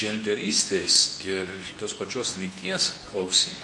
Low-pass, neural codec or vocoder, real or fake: 10.8 kHz; codec, 24 kHz, 0.9 kbps, WavTokenizer, medium speech release version 2; fake